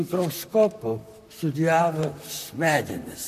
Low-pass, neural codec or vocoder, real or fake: 14.4 kHz; vocoder, 44.1 kHz, 128 mel bands, Pupu-Vocoder; fake